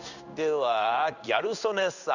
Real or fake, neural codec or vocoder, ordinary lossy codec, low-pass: fake; codec, 16 kHz in and 24 kHz out, 1 kbps, XY-Tokenizer; none; 7.2 kHz